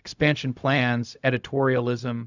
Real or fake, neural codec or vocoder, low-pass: fake; codec, 16 kHz, 0.4 kbps, LongCat-Audio-Codec; 7.2 kHz